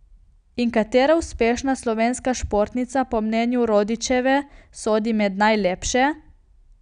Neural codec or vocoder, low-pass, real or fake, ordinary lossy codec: none; 9.9 kHz; real; none